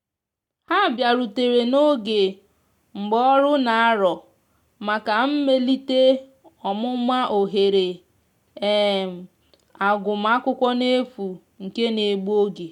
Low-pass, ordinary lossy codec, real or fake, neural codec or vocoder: 19.8 kHz; none; real; none